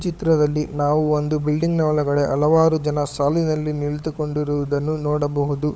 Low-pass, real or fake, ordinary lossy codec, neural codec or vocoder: none; fake; none; codec, 16 kHz, 16 kbps, FunCodec, trained on LibriTTS, 50 frames a second